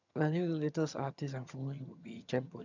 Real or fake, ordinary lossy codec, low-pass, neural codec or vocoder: fake; none; 7.2 kHz; vocoder, 22.05 kHz, 80 mel bands, HiFi-GAN